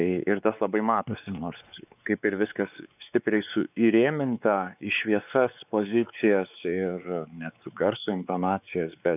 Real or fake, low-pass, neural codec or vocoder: fake; 3.6 kHz; codec, 16 kHz, 4 kbps, X-Codec, WavLM features, trained on Multilingual LibriSpeech